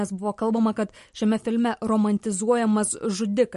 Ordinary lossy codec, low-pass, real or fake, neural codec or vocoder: MP3, 48 kbps; 14.4 kHz; real; none